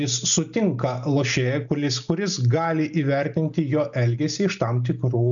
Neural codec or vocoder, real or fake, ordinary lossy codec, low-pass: none; real; MP3, 96 kbps; 7.2 kHz